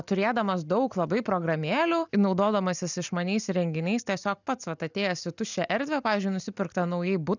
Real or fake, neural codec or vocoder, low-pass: real; none; 7.2 kHz